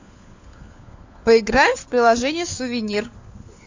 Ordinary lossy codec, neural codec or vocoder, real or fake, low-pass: AAC, 48 kbps; codec, 16 kHz, 4 kbps, FunCodec, trained on LibriTTS, 50 frames a second; fake; 7.2 kHz